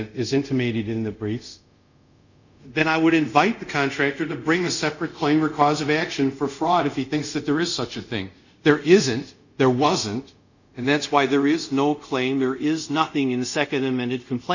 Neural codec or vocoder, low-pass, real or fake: codec, 24 kHz, 0.5 kbps, DualCodec; 7.2 kHz; fake